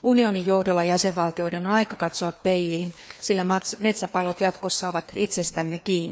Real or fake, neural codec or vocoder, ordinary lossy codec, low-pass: fake; codec, 16 kHz, 2 kbps, FreqCodec, larger model; none; none